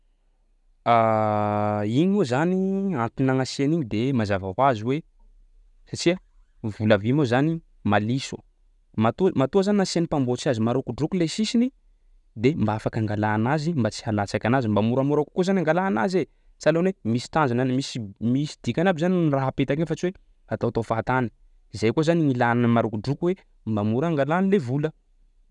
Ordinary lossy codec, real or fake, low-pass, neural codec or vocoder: none; real; 10.8 kHz; none